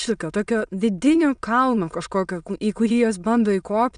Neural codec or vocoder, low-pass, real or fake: autoencoder, 22.05 kHz, a latent of 192 numbers a frame, VITS, trained on many speakers; 9.9 kHz; fake